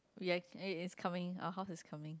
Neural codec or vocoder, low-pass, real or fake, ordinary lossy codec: none; none; real; none